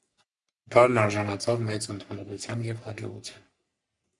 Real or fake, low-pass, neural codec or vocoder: fake; 10.8 kHz; codec, 44.1 kHz, 3.4 kbps, Pupu-Codec